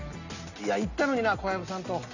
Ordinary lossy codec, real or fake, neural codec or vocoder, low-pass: none; real; none; 7.2 kHz